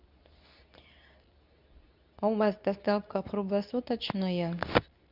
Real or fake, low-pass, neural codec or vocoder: fake; 5.4 kHz; codec, 24 kHz, 0.9 kbps, WavTokenizer, medium speech release version 2